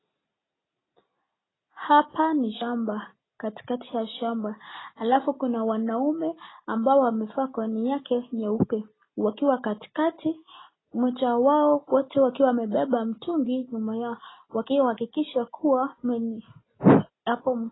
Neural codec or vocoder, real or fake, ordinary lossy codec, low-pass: none; real; AAC, 16 kbps; 7.2 kHz